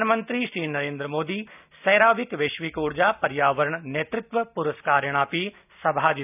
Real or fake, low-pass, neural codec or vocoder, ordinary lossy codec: real; 3.6 kHz; none; none